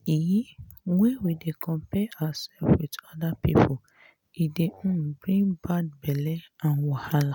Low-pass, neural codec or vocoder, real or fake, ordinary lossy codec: 19.8 kHz; none; real; none